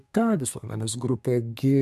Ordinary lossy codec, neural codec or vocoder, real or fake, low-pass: AAC, 96 kbps; codec, 32 kHz, 1.9 kbps, SNAC; fake; 14.4 kHz